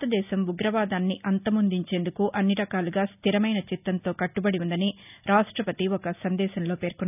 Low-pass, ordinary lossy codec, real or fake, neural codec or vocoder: 3.6 kHz; none; real; none